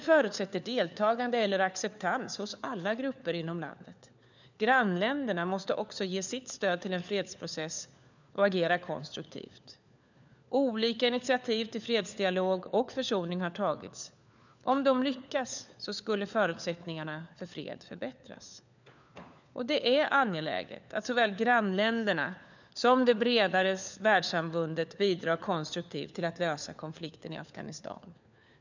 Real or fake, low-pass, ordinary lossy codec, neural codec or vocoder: fake; 7.2 kHz; none; codec, 16 kHz, 4 kbps, FunCodec, trained on LibriTTS, 50 frames a second